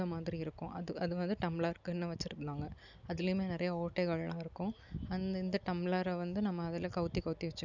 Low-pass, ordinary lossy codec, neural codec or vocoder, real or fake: 7.2 kHz; none; none; real